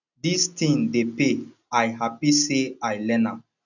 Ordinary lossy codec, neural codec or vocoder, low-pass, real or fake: none; none; 7.2 kHz; real